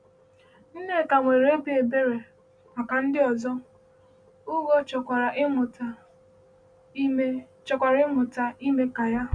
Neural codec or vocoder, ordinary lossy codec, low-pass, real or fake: none; none; 9.9 kHz; real